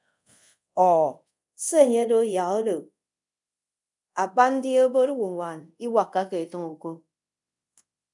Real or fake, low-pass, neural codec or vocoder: fake; 10.8 kHz; codec, 24 kHz, 0.5 kbps, DualCodec